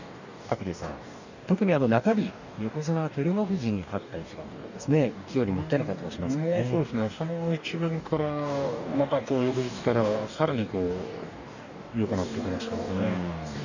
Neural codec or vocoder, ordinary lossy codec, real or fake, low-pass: codec, 44.1 kHz, 2.6 kbps, DAC; none; fake; 7.2 kHz